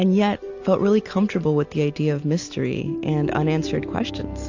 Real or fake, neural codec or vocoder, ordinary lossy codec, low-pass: real; none; AAC, 48 kbps; 7.2 kHz